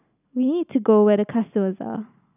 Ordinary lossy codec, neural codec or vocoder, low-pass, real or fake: none; none; 3.6 kHz; real